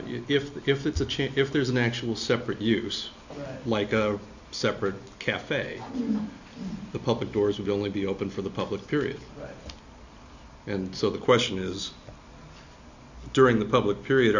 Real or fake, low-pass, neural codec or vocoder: real; 7.2 kHz; none